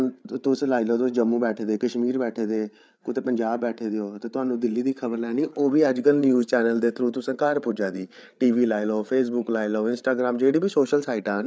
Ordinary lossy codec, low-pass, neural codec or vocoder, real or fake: none; none; codec, 16 kHz, 8 kbps, FreqCodec, larger model; fake